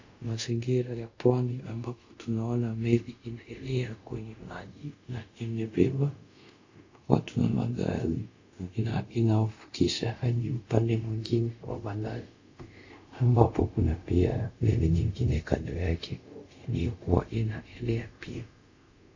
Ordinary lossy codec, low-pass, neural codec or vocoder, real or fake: AAC, 48 kbps; 7.2 kHz; codec, 24 kHz, 0.5 kbps, DualCodec; fake